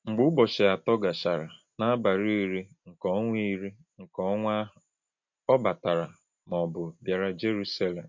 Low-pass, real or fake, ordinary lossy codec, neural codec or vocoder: 7.2 kHz; real; MP3, 48 kbps; none